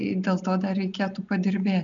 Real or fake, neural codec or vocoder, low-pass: real; none; 7.2 kHz